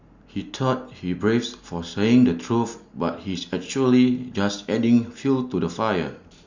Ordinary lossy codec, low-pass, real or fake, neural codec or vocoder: Opus, 64 kbps; 7.2 kHz; real; none